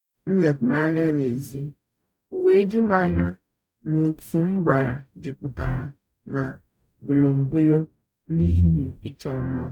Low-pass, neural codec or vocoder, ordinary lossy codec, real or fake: 19.8 kHz; codec, 44.1 kHz, 0.9 kbps, DAC; none; fake